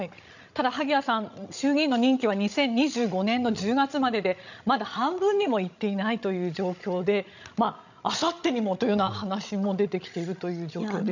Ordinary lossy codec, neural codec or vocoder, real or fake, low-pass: none; codec, 16 kHz, 16 kbps, FreqCodec, larger model; fake; 7.2 kHz